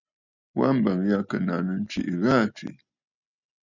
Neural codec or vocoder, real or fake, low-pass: none; real; 7.2 kHz